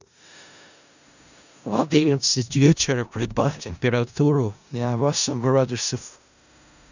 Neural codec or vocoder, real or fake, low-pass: codec, 16 kHz in and 24 kHz out, 0.4 kbps, LongCat-Audio-Codec, four codebook decoder; fake; 7.2 kHz